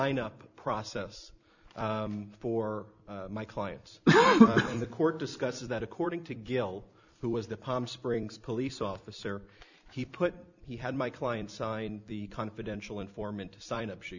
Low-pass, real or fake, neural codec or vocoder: 7.2 kHz; fake; vocoder, 44.1 kHz, 128 mel bands every 256 samples, BigVGAN v2